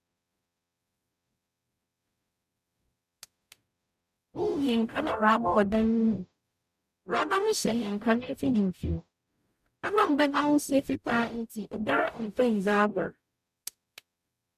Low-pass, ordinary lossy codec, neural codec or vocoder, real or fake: 14.4 kHz; none; codec, 44.1 kHz, 0.9 kbps, DAC; fake